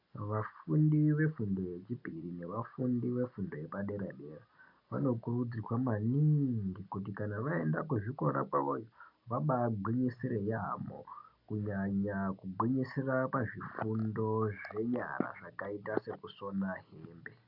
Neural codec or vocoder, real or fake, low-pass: none; real; 5.4 kHz